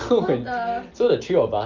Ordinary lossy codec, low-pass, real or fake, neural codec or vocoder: Opus, 32 kbps; 7.2 kHz; real; none